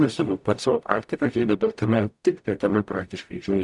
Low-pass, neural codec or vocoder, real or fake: 10.8 kHz; codec, 44.1 kHz, 0.9 kbps, DAC; fake